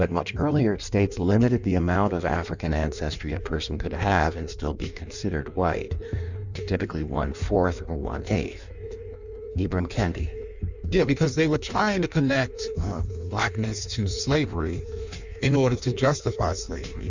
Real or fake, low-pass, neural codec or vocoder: fake; 7.2 kHz; codec, 16 kHz in and 24 kHz out, 1.1 kbps, FireRedTTS-2 codec